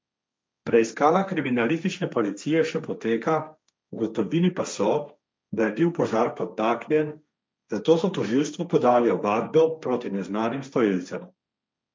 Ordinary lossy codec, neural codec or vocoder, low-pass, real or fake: none; codec, 16 kHz, 1.1 kbps, Voila-Tokenizer; none; fake